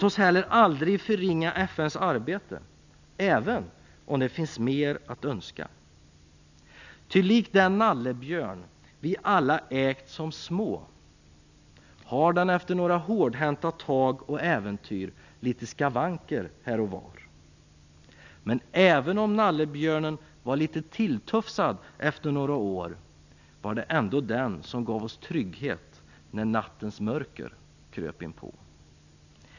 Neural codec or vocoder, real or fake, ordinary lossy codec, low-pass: none; real; none; 7.2 kHz